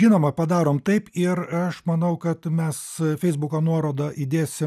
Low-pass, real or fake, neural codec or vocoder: 14.4 kHz; real; none